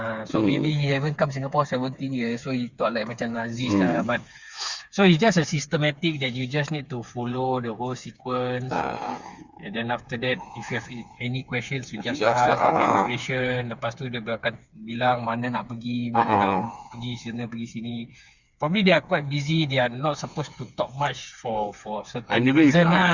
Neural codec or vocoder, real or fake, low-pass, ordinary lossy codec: codec, 16 kHz, 4 kbps, FreqCodec, smaller model; fake; 7.2 kHz; Opus, 64 kbps